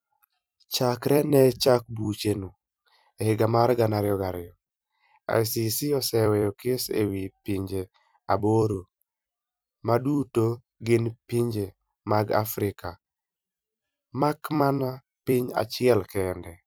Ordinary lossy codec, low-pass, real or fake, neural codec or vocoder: none; none; fake; vocoder, 44.1 kHz, 128 mel bands every 256 samples, BigVGAN v2